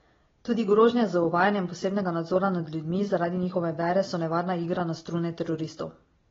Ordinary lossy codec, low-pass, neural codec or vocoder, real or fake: AAC, 24 kbps; 7.2 kHz; none; real